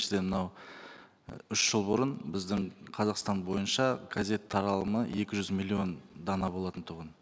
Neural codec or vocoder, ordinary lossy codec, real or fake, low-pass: none; none; real; none